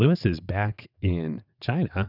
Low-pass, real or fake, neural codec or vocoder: 5.4 kHz; fake; vocoder, 22.05 kHz, 80 mel bands, WaveNeXt